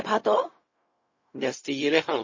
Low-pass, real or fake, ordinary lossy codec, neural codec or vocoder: 7.2 kHz; fake; MP3, 32 kbps; codec, 16 kHz, 0.4 kbps, LongCat-Audio-Codec